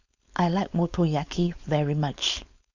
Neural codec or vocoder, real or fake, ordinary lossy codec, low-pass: codec, 16 kHz, 4.8 kbps, FACodec; fake; none; 7.2 kHz